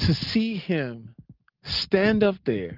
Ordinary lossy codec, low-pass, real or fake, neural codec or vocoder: Opus, 24 kbps; 5.4 kHz; real; none